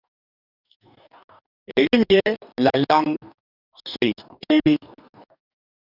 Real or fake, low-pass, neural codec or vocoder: fake; 5.4 kHz; codec, 44.1 kHz, 2.6 kbps, DAC